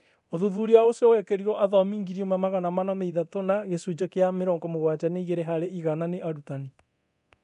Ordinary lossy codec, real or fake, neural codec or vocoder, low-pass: none; fake; codec, 24 kHz, 0.9 kbps, DualCodec; 10.8 kHz